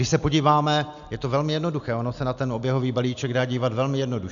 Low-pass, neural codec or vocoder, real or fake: 7.2 kHz; none; real